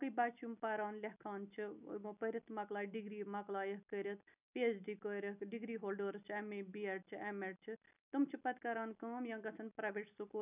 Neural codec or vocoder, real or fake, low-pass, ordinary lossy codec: none; real; 3.6 kHz; none